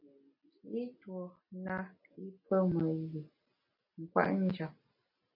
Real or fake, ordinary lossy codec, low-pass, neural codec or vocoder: real; MP3, 32 kbps; 5.4 kHz; none